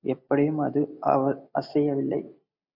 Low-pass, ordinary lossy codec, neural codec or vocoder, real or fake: 5.4 kHz; AAC, 48 kbps; none; real